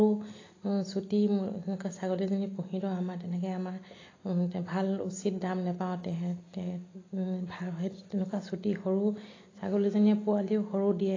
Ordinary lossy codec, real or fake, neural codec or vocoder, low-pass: AAC, 32 kbps; real; none; 7.2 kHz